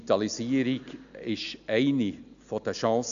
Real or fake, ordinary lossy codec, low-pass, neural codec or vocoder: real; none; 7.2 kHz; none